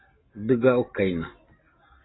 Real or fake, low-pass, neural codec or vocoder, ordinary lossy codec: real; 7.2 kHz; none; AAC, 16 kbps